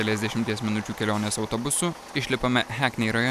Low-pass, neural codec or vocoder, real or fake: 14.4 kHz; none; real